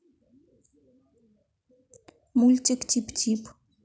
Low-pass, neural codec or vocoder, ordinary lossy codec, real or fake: none; none; none; real